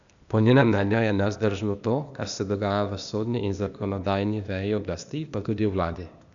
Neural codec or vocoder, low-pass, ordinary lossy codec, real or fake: codec, 16 kHz, 0.8 kbps, ZipCodec; 7.2 kHz; none; fake